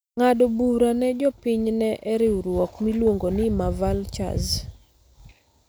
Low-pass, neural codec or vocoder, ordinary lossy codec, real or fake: none; none; none; real